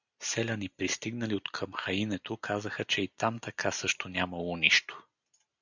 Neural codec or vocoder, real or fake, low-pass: none; real; 7.2 kHz